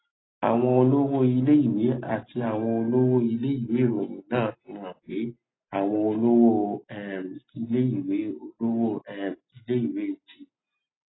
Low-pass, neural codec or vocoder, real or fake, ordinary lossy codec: 7.2 kHz; none; real; AAC, 16 kbps